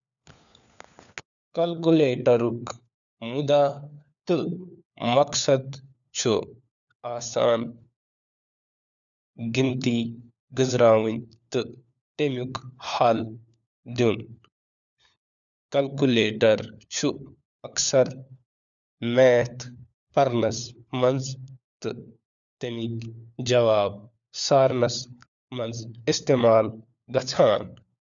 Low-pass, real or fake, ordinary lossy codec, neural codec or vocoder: 7.2 kHz; fake; none; codec, 16 kHz, 4 kbps, FunCodec, trained on LibriTTS, 50 frames a second